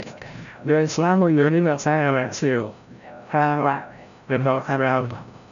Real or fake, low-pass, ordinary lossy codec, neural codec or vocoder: fake; 7.2 kHz; none; codec, 16 kHz, 0.5 kbps, FreqCodec, larger model